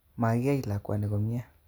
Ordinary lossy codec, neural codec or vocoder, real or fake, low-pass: none; none; real; none